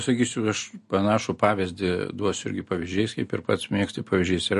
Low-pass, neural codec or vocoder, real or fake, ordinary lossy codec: 14.4 kHz; none; real; MP3, 48 kbps